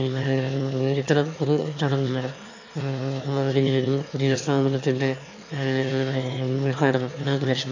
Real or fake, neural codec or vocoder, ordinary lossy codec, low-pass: fake; autoencoder, 22.05 kHz, a latent of 192 numbers a frame, VITS, trained on one speaker; AAC, 48 kbps; 7.2 kHz